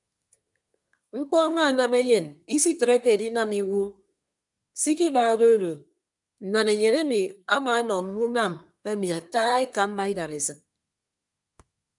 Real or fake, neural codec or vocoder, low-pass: fake; codec, 24 kHz, 1 kbps, SNAC; 10.8 kHz